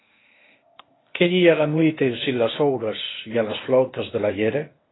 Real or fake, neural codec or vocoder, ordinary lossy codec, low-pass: fake; codec, 16 kHz, 0.8 kbps, ZipCodec; AAC, 16 kbps; 7.2 kHz